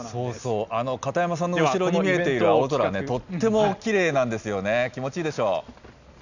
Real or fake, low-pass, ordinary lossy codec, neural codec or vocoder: real; 7.2 kHz; none; none